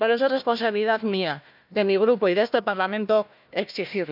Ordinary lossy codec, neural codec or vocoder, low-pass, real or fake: none; codec, 16 kHz, 1 kbps, FunCodec, trained on Chinese and English, 50 frames a second; 5.4 kHz; fake